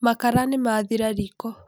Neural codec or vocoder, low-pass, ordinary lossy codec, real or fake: none; none; none; real